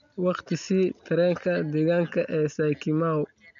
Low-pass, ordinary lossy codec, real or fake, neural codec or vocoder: 7.2 kHz; none; real; none